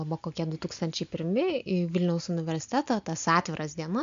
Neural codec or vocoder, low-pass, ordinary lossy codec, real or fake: none; 7.2 kHz; MP3, 64 kbps; real